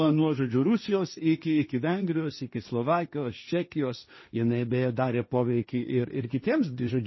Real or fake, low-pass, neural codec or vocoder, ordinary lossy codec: fake; 7.2 kHz; codec, 16 kHz, 1.1 kbps, Voila-Tokenizer; MP3, 24 kbps